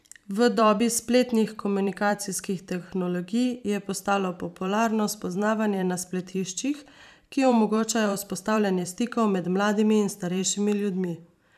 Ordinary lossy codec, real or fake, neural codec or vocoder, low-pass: none; fake; vocoder, 44.1 kHz, 128 mel bands every 512 samples, BigVGAN v2; 14.4 kHz